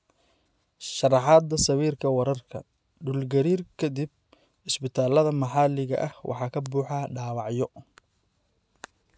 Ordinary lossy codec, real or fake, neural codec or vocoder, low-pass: none; real; none; none